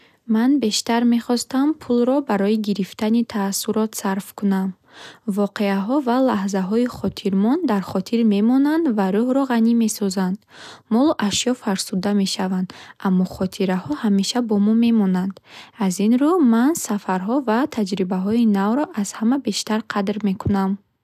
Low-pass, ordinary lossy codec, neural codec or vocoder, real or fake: 14.4 kHz; none; none; real